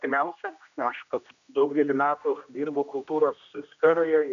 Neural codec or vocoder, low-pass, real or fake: codec, 16 kHz, 1 kbps, X-Codec, HuBERT features, trained on general audio; 7.2 kHz; fake